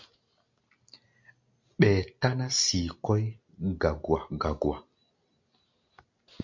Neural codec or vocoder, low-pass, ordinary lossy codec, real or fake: none; 7.2 kHz; MP3, 48 kbps; real